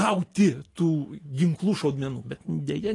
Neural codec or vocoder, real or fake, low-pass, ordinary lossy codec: none; real; 10.8 kHz; AAC, 32 kbps